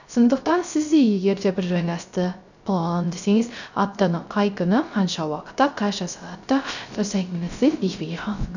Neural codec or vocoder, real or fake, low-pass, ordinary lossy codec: codec, 16 kHz, 0.3 kbps, FocalCodec; fake; 7.2 kHz; none